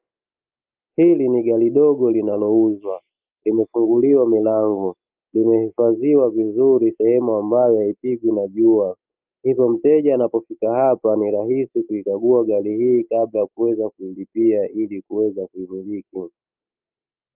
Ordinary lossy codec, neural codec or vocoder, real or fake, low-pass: Opus, 24 kbps; none; real; 3.6 kHz